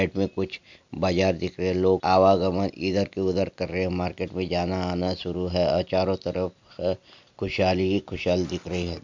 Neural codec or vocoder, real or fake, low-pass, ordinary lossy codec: none; real; 7.2 kHz; MP3, 64 kbps